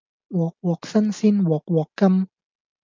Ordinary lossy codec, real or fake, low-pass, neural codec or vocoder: MP3, 64 kbps; real; 7.2 kHz; none